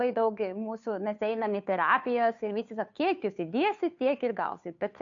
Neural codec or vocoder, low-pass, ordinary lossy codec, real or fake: codec, 16 kHz, 2 kbps, FunCodec, trained on Chinese and English, 25 frames a second; 7.2 kHz; MP3, 64 kbps; fake